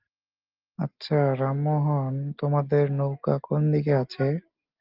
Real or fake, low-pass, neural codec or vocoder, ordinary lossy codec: real; 5.4 kHz; none; Opus, 32 kbps